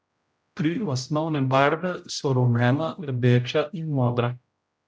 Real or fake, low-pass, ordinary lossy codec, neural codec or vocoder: fake; none; none; codec, 16 kHz, 0.5 kbps, X-Codec, HuBERT features, trained on general audio